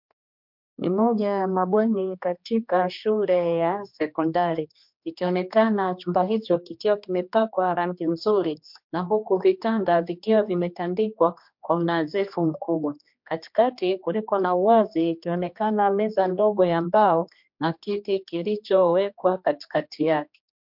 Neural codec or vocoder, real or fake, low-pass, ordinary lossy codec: codec, 16 kHz, 2 kbps, X-Codec, HuBERT features, trained on general audio; fake; 5.4 kHz; MP3, 48 kbps